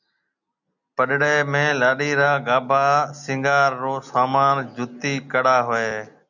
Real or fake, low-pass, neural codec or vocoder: real; 7.2 kHz; none